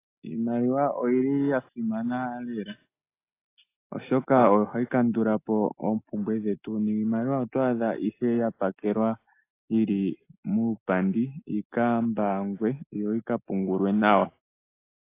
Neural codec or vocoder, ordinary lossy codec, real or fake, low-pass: none; AAC, 24 kbps; real; 3.6 kHz